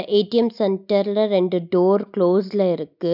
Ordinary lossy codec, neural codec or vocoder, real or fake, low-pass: none; none; real; 5.4 kHz